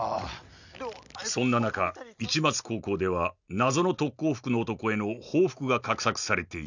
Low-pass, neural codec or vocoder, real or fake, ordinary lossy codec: 7.2 kHz; none; real; none